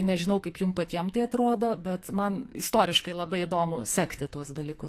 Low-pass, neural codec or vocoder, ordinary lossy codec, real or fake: 14.4 kHz; codec, 44.1 kHz, 2.6 kbps, SNAC; AAC, 64 kbps; fake